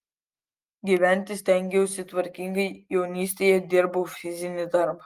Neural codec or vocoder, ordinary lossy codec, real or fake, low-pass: none; Opus, 32 kbps; real; 14.4 kHz